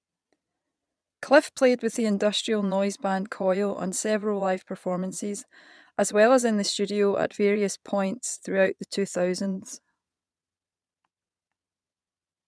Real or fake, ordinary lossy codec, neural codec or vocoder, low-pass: fake; none; vocoder, 22.05 kHz, 80 mel bands, Vocos; none